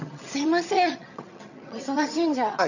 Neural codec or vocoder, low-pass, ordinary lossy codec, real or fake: vocoder, 22.05 kHz, 80 mel bands, HiFi-GAN; 7.2 kHz; none; fake